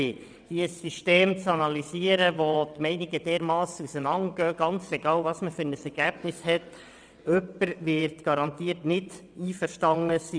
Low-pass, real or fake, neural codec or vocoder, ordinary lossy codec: 9.9 kHz; fake; vocoder, 22.05 kHz, 80 mel bands, WaveNeXt; none